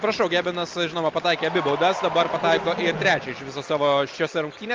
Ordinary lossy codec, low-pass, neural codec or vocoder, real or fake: Opus, 24 kbps; 7.2 kHz; none; real